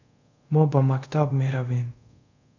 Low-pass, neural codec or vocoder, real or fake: 7.2 kHz; codec, 24 kHz, 0.5 kbps, DualCodec; fake